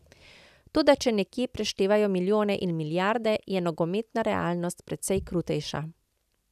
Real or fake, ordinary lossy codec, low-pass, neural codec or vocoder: real; none; 14.4 kHz; none